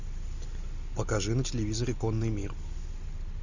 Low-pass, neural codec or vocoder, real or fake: 7.2 kHz; none; real